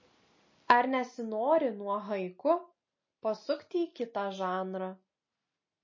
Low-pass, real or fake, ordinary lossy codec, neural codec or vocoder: 7.2 kHz; real; MP3, 32 kbps; none